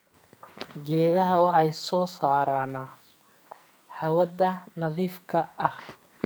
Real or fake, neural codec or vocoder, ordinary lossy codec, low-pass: fake; codec, 44.1 kHz, 2.6 kbps, SNAC; none; none